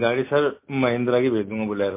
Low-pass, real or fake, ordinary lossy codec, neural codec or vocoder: 3.6 kHz; real; MP3, 24 kbps; none